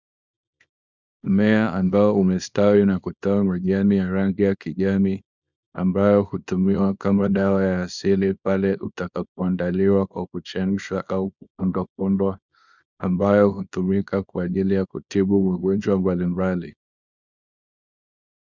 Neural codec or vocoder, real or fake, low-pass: codec, 24 kHz, 0.9 kbps, WavTokenizer, small release; fake; 7.2 kHz